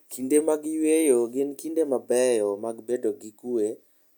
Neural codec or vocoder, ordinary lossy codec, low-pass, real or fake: none; none; none; real